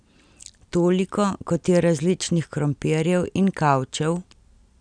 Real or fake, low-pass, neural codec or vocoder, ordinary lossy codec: real; 9.9 kHz; none; none